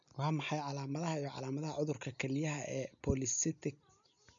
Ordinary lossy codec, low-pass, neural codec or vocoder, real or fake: none; 7.2 kHz; none; real